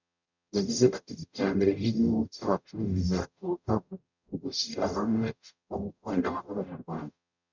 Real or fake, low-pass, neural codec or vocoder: fake; 7.2 kHz; codec, 44.1 kHz, 0.9 kbps, DAC